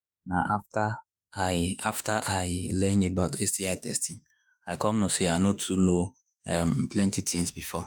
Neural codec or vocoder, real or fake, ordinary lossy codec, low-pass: autoencoder, 48 kHz, 32 numbers a frame, DAC-VAE, trained on Japanese speech; fake; none; none